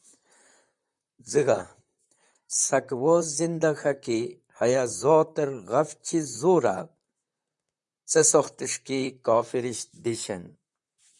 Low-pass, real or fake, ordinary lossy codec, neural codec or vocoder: 10.8 kHz; fake; MP3, 96 kbps; vocoder, 44.1 kHz, 128 mel bands, Pupu-Vocoder